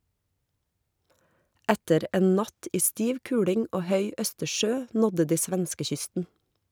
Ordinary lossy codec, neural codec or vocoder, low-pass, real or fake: none; none; none; real